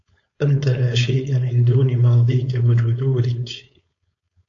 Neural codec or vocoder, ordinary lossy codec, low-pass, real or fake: codec, 16 kHz, 4.8 kbps, FACodec; AAC, 48 kbps; 7.2 kHz; fake